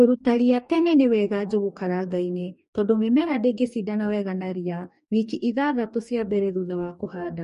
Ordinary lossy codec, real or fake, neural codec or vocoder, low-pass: MP3, 48 kbps; fake; codec, 44.1 kHz, 2.6 kbps, DAC; 14.4 kHz